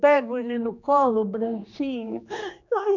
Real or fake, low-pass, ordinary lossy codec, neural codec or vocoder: fake; 7.2 kHz; none; codec, 16 kHz, 2 kbps, X-Codec, HuBERT features, trained on general audio